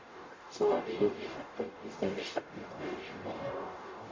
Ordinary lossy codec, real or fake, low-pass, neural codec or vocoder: MP3, 48 kbps; fake; 7.2 kHz; codec, 44.1 kHz, 0.9 kbps, DAC